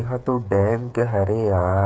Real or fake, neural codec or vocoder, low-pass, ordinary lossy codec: fake; codec, 16 kHz, 8 kbps, FreqCodec, smaller model; none; none